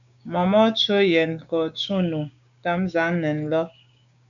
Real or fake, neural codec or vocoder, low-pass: fake; codec, 16 kHz, 6 kbps, DAC; 7.2 kHz